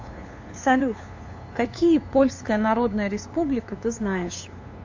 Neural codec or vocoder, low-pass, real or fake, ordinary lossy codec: codec, 16 kHz, 2 kbps, FunCodec, trained on LibriTTS, 25 frames a second; 7.2 kHz; fake; AAC, 48 kbps